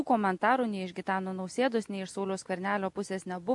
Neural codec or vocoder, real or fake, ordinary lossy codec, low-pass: none; real; MP3, 64 kbps; 10.8 kHz